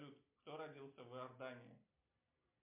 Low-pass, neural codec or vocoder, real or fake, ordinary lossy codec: 3.6 kHz; none; real; MP3, 32 kbps